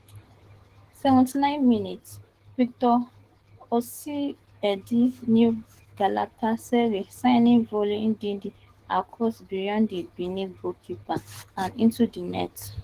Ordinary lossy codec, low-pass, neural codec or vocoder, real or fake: Opus, 16 kbps; 14.4 kHz; codec, 44.1 kHz, 7.8 kbps, DAC; fake